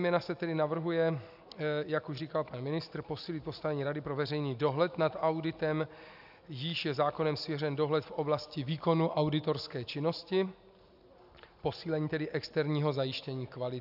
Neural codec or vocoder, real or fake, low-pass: none; real; 5.4 kHz